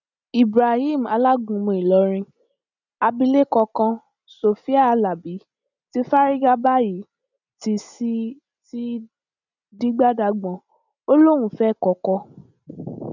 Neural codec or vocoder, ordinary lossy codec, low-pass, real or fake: none; none; 7.2 kHz; real